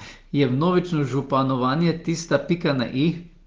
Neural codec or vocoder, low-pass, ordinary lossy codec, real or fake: none; 7.2 kHz; Opus, 16 kbps; real